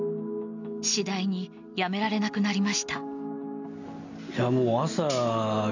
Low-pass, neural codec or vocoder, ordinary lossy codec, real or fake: 7.2 kHz; none; none; real